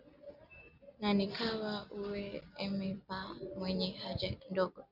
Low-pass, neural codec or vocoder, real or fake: 5.4 kHz; none; real